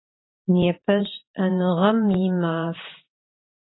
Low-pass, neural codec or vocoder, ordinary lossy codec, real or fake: 7.2 kHz; vocoder, 44.1 kHz, 128 mel bands every 512 samples, BigVGAN v2; AAC, 16 kbps; fake